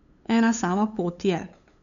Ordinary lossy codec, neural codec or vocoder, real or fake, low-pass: none; codec, 16 kHz, 8 kbps, FunCodec, trained on LibriTTS, 25 frames a second; fake; 7.2 kHz